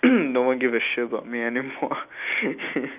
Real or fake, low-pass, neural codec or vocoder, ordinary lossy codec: real; 3.6 kHz; none; none